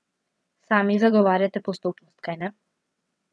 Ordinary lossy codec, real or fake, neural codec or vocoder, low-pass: none; fake; vocoder, 22.05 kHz, 80 mel bands, WaveNeXt; none